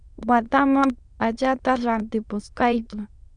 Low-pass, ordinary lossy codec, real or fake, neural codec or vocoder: 9.9 kHz; Opus, 64 kbps; fake; autoencoder, 22.05 kHz, a latent of 192 numbers a frame, VITS, trained on many speakers